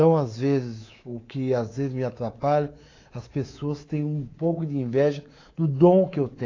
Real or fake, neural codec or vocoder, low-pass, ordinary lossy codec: fake; codec, 24 kHz, 3.1 kbps, DualCodec; 7.2 kHz; AAC, 32 kbps